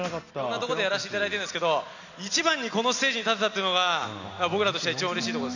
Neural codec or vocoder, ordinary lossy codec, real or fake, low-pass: none; none; real; 7.2 kHz